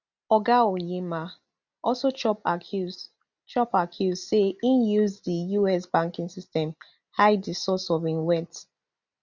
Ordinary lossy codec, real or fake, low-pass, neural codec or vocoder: none; real; 7.2 kHz; none